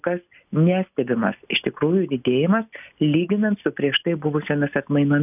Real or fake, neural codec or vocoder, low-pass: real; none; 3.6 kHz